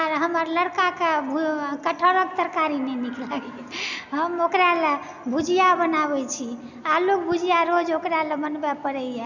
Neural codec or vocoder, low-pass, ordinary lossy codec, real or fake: none; 7.2 kHz; none; real